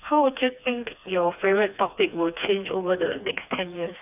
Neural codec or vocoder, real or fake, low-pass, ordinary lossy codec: codec, 16 kHz, 2 kbps, FreqCodec, smaller model; fake; 3.6 kHz; none